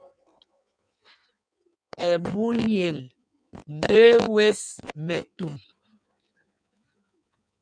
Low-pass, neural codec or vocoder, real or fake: 9.9 kHz; codec, 16 kHz in and 24 kHz out, 1.1 kbps, FireRedTTS-2 codec; fake